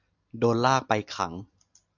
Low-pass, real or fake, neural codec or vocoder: 7.2 kHz; real; none